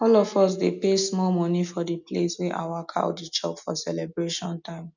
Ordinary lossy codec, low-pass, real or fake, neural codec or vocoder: none; 7.2 kHz; real; none